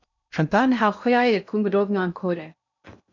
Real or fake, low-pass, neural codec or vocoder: fake; 7.2 kHz; codec, 16 kHz in and 24 kHz out, 0.6 kbps, FocalCodec, streaming, 2048 codes